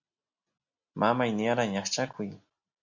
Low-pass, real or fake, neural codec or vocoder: 7.2 kHz; real; none